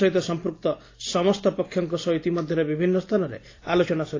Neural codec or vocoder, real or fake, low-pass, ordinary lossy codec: none; real; 7.2 kHz; AAC, 32 kbps